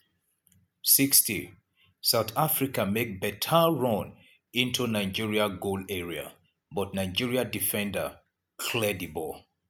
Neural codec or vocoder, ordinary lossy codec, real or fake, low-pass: none; none; real; none